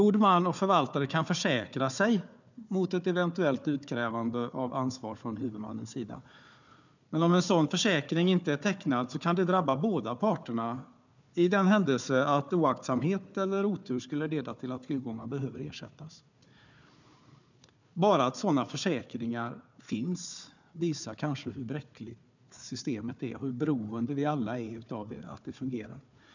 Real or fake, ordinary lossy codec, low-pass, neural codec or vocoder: fake; none; 7.2 kHz; codec, 16 kHz, 4 kbps, FunCodec, trained on Chinese and English, 50 frames a second